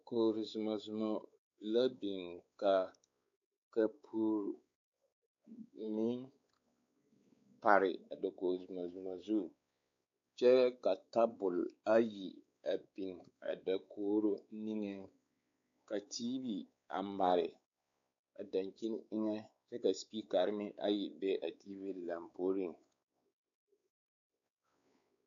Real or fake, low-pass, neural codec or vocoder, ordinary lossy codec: fake; 7.2 kHz; codec, 16 kHz, 4 kbps, X-Codec, WavLM features, trained on Multilingual LibriSpeech; AAC, 64 kbps